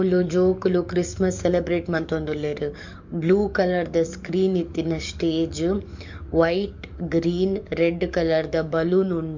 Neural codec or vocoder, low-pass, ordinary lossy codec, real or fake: codec, 44.1 kHz, 7.8 kbps, DAC; 7.2 kHz; MP3, 64 kbps; fake